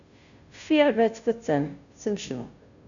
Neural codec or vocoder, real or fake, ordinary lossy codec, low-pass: codec, 16 kHz, 0.5 kbps, FunCodec, trained on Chinese and English, 25 frames a second; fake; none; 7.2 kHz